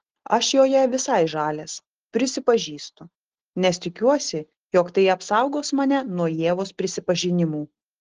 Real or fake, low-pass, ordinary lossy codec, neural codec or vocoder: real; 7.2 kHz; Opus, 16 kbps; none